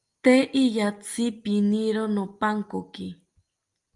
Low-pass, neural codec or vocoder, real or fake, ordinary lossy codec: 10.8 kHz; none; real; Opus, 32 kbps